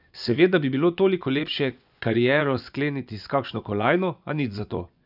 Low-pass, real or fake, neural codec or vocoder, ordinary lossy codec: 5.4 kHz; fake; vocoder, 24 kHz, 100 mel bands, Vocos; none